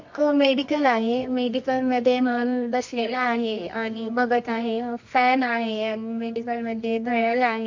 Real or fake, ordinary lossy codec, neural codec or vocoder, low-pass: fake; MP3, 48 kbps; codec, 24 kHz, 0.9 kbps, WavTokenizer, medium music audio release; 7.2 kHz